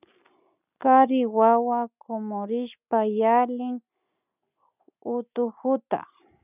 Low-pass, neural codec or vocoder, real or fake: 3.6 kHz; none; real